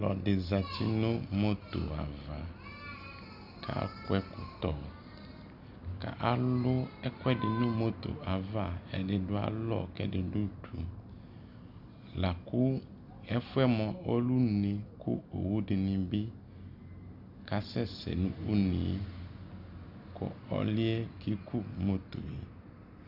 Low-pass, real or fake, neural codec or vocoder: 5.4 kHz; real; none